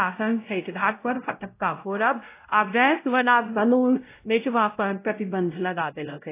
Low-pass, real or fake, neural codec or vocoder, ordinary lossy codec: 3.6 kHz; fake; codec, 16 kHz, 0.5 kbps, X-Codec, WavLM features, trained on Multilingual LibriSpeech; AAC, 24 kbps